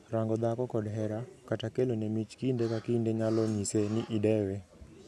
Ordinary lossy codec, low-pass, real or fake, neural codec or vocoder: none; none; real; none